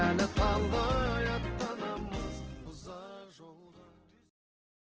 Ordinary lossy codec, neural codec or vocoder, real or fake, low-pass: Opus, 16 kbps; none; real; 7.2 kHz